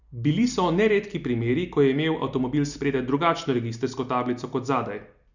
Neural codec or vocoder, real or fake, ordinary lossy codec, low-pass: none; real; none; 7.2 kHz